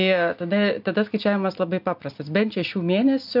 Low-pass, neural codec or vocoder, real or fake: 5.4 kHz; none; real